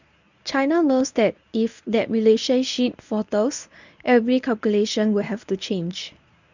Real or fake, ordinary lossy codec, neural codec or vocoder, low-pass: fake; none; codec, 24 kHz, 0.9 kbps, WavTokenizer, medium speech release version 1; 7.2 kHz